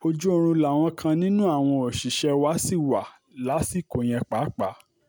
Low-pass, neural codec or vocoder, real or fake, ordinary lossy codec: none; none; real; none